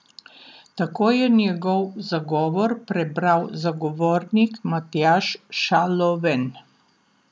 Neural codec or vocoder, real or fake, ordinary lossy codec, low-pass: none; real; none; none